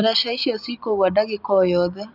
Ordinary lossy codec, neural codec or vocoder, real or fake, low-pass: none; none; real; 5.4 kHz